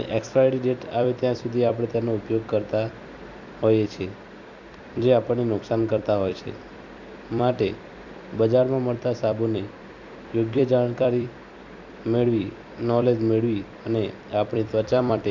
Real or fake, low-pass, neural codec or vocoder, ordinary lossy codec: fake; 7.2 kHz; vocoder, 44.1 kHz, 128 mel bands every 256 samples, BigVGAN v2; none